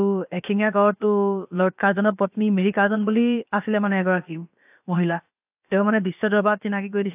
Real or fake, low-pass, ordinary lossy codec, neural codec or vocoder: fake; 3.6 kHz; AAC, 32 kbps; codec, 16 kHz, about 1 kbps, DyCAST, with the encoder's durations